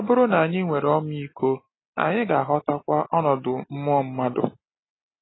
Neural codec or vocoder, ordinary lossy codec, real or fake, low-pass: none; AAC, 16 kbps; real; 7.2 kHz